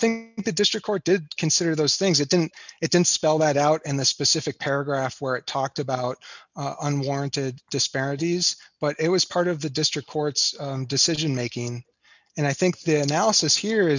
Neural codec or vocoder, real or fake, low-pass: none; real; 7.2 kHz